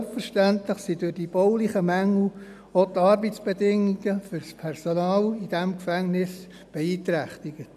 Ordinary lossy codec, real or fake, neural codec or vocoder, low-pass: none; real; none; 14.4 kHz